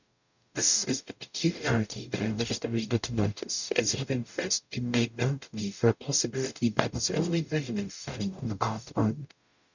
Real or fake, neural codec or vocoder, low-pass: fake; codec, 44.1 kHz, 0.9 kbps, DAC; 7.2 kHz